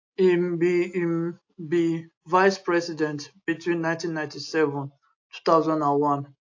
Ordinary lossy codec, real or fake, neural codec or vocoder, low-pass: AAC, 48 kbps; real; none; 7.2 kHz